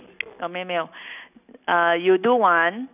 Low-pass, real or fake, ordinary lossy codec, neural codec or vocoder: 3.6 kHz; real; none; none